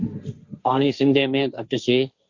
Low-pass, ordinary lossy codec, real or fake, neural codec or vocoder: 7.2 kHz; Opus, 64 kbps; fake; codec, 16 kHz, 1.1 kbps, Voila-Tokenizer